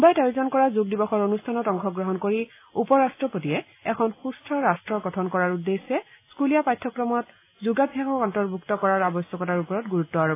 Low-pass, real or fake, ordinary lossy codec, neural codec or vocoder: 3.6 kHz; real; AAC, 24 kbps; none